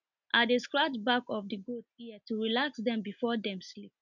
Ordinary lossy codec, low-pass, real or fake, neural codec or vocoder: none; 7.2 kHz; real; none